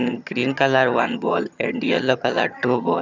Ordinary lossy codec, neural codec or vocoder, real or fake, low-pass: none; vocoder, 22.05 kHz, 80 mel bands, HiFi-GAN; fake; 7.2 kHz